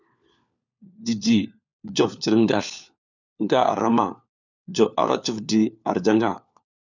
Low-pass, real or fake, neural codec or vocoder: 7.2 kHz; fake; codec, 16 kHz, 4 kbps, FunCodec, trained on LibriTTS, 50 frames a second